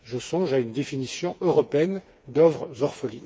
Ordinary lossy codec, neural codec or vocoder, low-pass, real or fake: none; codec, 16 kHz, 4 kbps, FreqCodec, smaller model; none; fake